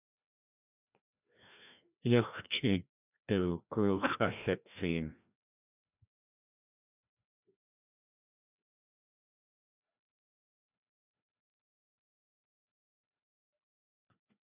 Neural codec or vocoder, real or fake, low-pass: codec, 16 kHz, 1 kbps, FreqCodec, larger model; fake; 3.6 kHz